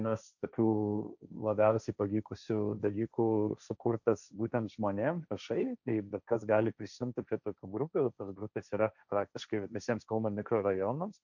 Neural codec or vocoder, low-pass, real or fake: codec, 16 kHz, 1.1 kbps, Voila-Tokenizer; 7.2 kHz; fake